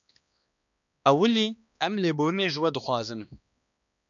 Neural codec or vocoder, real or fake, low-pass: codec, 16 kHz, 2 kbps, X-Codec, HuBERT features, trained on balanced general audio; fake; 7.2 kHz